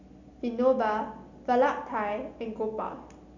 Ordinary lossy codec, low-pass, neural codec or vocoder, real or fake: none; 7.2 kHz; none; real